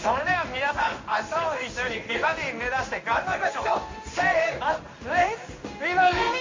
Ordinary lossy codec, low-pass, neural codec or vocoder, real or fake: MP3, 32 kbps; 7.2 kHz; codec, 16 kHz in and 24 kHz out, 1 kbps, XY-Tokenizer; fake